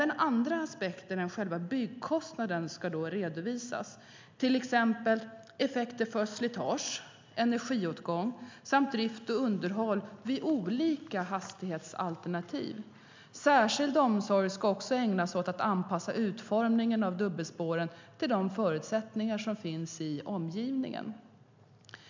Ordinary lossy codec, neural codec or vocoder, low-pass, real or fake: MP3, 64 kbps; none; 7.2 kHz; real